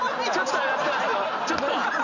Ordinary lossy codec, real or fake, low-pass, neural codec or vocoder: none; real; 7.2 kHz; none